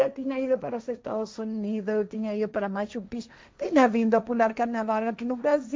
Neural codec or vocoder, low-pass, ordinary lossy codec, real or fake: codec, 16 kHz, 1.1 kbps, Voila-Tokenizer; none; none; fake